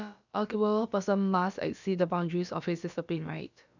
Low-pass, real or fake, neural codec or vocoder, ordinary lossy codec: 7.2 kHz; fake; codec, 16 kHz, about 1 kbps, DyCAST, with the encoder's durations; none